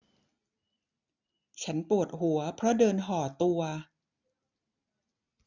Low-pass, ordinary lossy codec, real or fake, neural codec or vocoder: 7.2 kHz; none; real; none